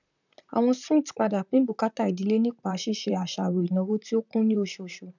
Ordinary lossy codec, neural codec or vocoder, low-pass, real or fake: none; vocoder, 44.1 kHz, 128 mel bands, Pupu-Vocoder; 7.2 kHz; fake